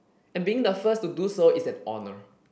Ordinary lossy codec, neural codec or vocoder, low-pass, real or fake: none; none; none; real